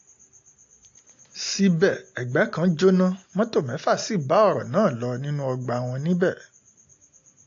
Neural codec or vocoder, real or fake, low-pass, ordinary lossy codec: none; real; 7.2 kHz; AAC, 48 kbps